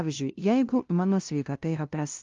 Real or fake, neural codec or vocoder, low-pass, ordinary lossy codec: fake; codec, 16 kHz, 0.5 kbps, FunCodec, trained on LibriTTS, 25 frames a second; 7.2 kHz; Opus, 32 kbps